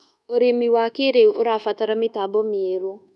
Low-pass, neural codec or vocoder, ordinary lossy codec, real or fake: none; codec, 24 kHz, 1.2 kbps, DualCodec; none; fake